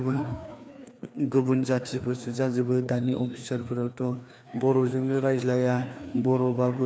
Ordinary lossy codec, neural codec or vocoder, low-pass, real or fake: none; codec, 16 kHz, 2 kbps, FreqCodec, larger model; none; fake